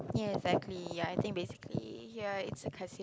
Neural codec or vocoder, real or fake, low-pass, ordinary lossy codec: none; real; none; none